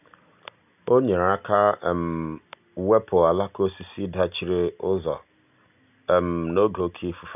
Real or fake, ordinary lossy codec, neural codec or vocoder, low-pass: real; none; none; 3.6 kHz